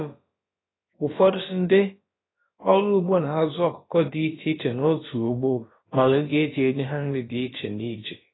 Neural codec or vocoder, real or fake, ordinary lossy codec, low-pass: codec, 16 kHz, about 1 kbps, DyCAST, with the encoder's durations; fake; AAC, 16 kbps; 7.2 kHz